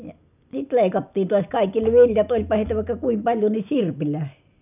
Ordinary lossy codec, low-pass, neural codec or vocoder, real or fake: none; 3.6 kHz; none; real